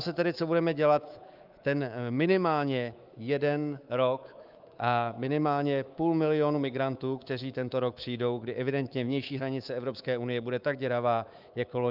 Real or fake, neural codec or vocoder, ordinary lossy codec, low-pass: fake; codec, 24 kHz, 3.1 kbps, DualCodec; Opus, 24 kbps; 5.4 kHz